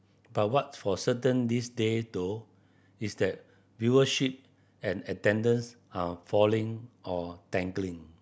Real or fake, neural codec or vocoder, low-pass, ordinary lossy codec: real; none; none; none